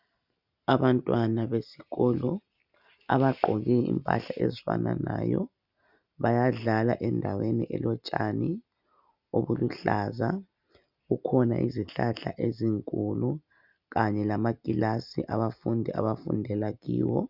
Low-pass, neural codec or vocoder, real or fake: 5.4 kHz; none; real